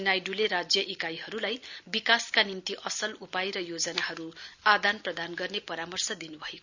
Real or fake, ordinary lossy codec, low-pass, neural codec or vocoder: real; none; 7.2 kHz; none